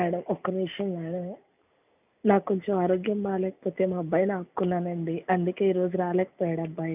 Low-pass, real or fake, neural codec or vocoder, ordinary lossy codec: 3.6 kHz; real; none; none